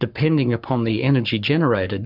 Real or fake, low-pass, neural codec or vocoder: fake; 5.4 kHz; codec, 44.1 kHz, 7.8 kbps, Pupu-Codec